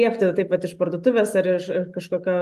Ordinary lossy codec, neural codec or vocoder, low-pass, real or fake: Opus, 32 kbps; none; 14.4 kHz; real